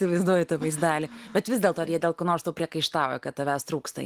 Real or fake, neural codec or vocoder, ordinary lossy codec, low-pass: real; none; Opus, 24 kbps; 14.4 kHz